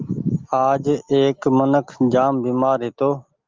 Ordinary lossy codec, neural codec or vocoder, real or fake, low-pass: Opus, 32 kbps; none; real; 7.2 kHz